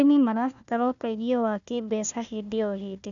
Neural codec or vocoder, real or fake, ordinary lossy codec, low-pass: codec, 16 kHz, 1 kbps, FunCodec, trained on Chinese and English, 50 frames a second; fake; none; 7.2 kHz